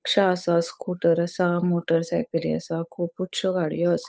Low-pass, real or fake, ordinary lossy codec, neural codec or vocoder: none; fake; none; codec, 16 kHz, 8 kbps, FunCodec, trained on Chinese and English, 25 frames a second